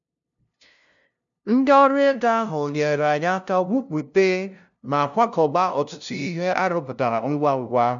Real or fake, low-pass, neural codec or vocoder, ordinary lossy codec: fake; 7.2 kHz; codec, 16 kHz, 0.5 kbps, FunCodec, trained on LibriTTS, 25 frames a second; none